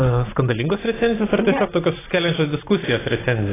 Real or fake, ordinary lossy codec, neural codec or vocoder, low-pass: real; AAC, 16 kbps; none; 3.6 kHz